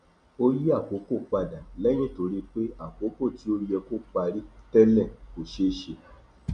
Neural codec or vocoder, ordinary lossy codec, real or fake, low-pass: none; none; real; 9.9 kHz